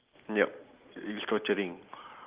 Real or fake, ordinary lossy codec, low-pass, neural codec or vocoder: real; Opus, 32 kbps; 3.6 kHz; none